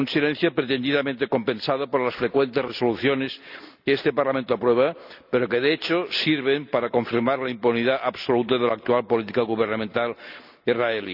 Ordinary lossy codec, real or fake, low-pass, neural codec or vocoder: none; real; 5.4 kHz; none